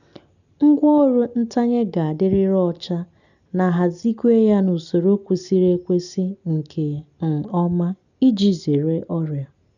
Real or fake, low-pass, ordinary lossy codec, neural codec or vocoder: real; 7.2 kHz; none; none